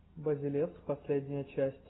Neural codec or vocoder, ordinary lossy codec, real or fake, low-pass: none; AAC, 16 kbps; real; 7.2 kHz